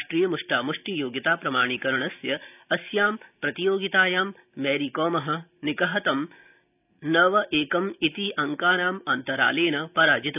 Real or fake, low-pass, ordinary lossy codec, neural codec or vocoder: real; 3.6 kHz; none; none